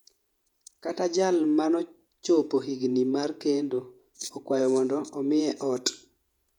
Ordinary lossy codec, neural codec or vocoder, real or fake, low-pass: none; vocoder, 48 kHz, 128 mel bands, Vocos; fake; 19.8 kHz